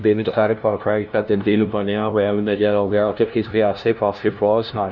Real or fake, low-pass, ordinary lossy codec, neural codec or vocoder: fake; none; none; codec, 16 kHz, 0.5 kbps, FunCodec, trained on LibriTTS, 25 frames a second